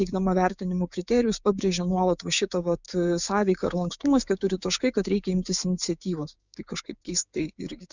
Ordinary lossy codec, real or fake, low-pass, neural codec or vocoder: Opus, 64 kbps; fake; 7.2 kHz; codec, 16 kHz, 6 kbps, DAC